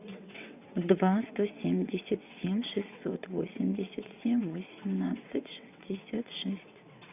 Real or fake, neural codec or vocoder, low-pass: fake; vocoder, 22.05 kHz, 80 mel bands, WaveNeXt; 3.6 kHz